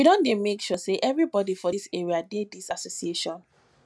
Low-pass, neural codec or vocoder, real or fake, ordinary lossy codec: none; none; real; none